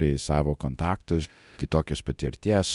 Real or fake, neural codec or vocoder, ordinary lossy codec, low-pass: fake; codec, 24 kHz, 0.9 kbps, DualCodec; MP3, 64 kbps; 10.8 kHz